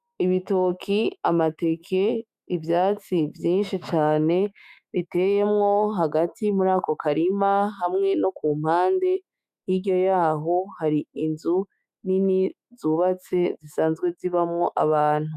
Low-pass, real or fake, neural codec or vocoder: 14.4 kHz; fake; autoencoder, 48 kHz, 128 numbers a frame, DAC-VAE, trained on Japanese speech